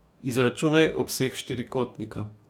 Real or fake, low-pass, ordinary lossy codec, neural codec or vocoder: fake; 19.8 kHz; none; codec, 44.1 kHz, 2.6 kbps, DAC